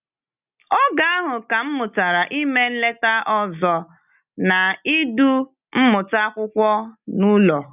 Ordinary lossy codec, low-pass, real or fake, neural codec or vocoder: none; 3.6 kHz; real; none